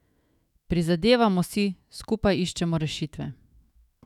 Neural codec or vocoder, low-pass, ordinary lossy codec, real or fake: autoencoder, 48 kHz, 128 numbers a frame, DAC-VAE, trained on Japanese speech; 19.8 kHz; none; fake